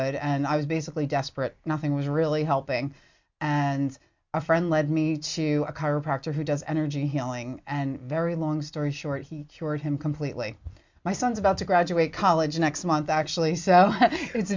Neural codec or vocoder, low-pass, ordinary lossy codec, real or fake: none; 7.2 kHz; MP3, 64 kbps; real